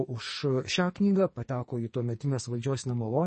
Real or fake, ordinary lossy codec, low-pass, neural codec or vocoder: fake; MP3, 32 kbps; 10.8 kHz; codec, 44.1 kHz, 2.6 kbps, SNAC